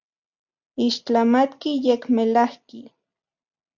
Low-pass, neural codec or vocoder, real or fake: 7.2 kHz; none; real